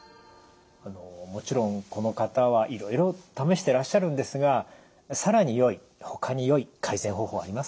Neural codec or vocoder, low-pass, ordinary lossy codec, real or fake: none; none; none; real